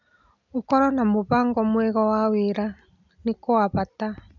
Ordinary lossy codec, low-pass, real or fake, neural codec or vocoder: none; 7.2 kHz; real; none